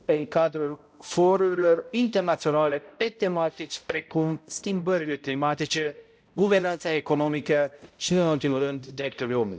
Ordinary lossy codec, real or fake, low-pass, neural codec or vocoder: none; fake; none; codec, 16 kHz, 0.5 kbps, X-Codec, HuBERT features, trained on balanced general audio